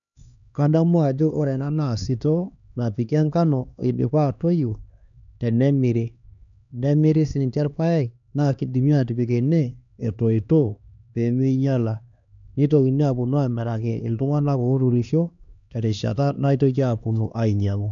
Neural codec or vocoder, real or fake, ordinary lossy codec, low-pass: codec, 16 kHz, 2 kbps, X-Codec, HuBERT features, trained on LibriSpeech; fake; none; 7.2 kHz